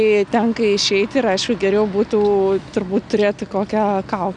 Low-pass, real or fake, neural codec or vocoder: 9.9 kHz; real; none